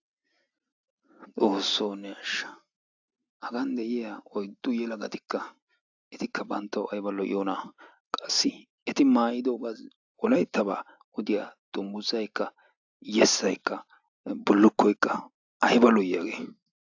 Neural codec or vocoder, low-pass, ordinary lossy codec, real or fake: none; 7.2 kHz; AAC, 48 kbps; real